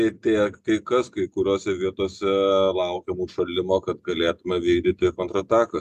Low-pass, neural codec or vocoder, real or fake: 14.4 kHz; none; real